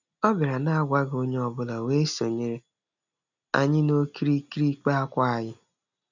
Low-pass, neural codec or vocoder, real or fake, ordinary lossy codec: 7.2 kHz; none; real; none